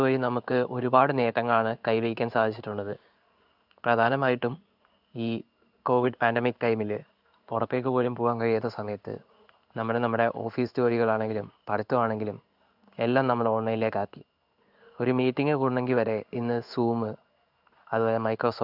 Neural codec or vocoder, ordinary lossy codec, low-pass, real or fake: codec, 16 kHz in and 24 kHz out, 1 kbps, XY-Tokenizer; none; 5.4 kHz; fake